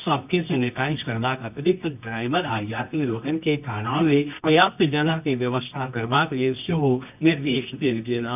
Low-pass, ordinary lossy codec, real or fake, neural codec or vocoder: 3.6 kHz; none; fake; codec, 24 kHz, 0.9 kbps, WavTokenizer, medium music audio release